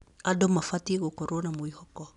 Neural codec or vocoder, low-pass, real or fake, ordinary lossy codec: none; 10.8 kHz; real; none